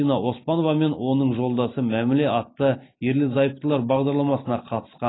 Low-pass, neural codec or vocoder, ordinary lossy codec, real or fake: 7.2 kHz; none; AAC, 16 kbps; real